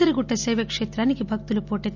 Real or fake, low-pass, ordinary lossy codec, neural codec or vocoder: real; 7.2 kHz; none; none